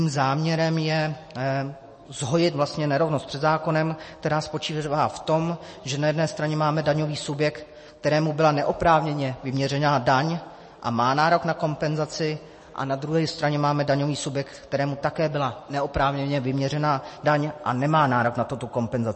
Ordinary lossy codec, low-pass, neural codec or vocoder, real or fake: MP3, 32 kbps; 10.8 kHz; none; real